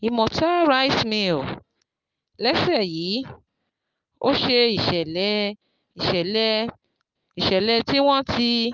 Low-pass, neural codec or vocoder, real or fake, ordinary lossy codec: 7.2 kHz; none; real; Opus, 24 kbps